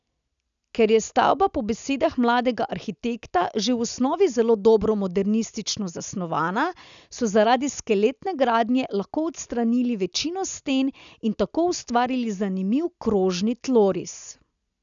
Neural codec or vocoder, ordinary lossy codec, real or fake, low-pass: none; none; real; 7.2 kHz